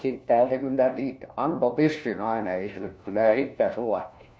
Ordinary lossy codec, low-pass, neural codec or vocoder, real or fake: none; none; codec, 16 kHz, 1 kbps, FunCodec, trained on LibriTTS, 50 frames a second; fake